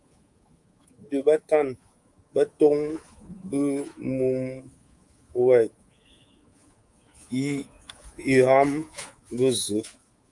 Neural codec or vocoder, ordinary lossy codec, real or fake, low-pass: codec, 24 kHz, 3.1 kbps, DualCodec; Opus, 32 kbps; fake; 10.8 kHz